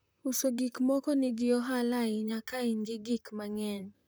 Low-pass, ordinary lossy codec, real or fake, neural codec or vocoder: none; none; fake; vocoder, 44.1 kHz, 128 mel bands, Pupu-Vocoder